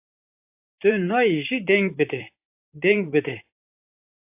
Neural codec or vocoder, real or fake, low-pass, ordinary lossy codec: vocoder, 44.1 kHz, 128 mel bands, Pupu-Vocoder; fake; 3.6 kHz; AAC, 24 kbps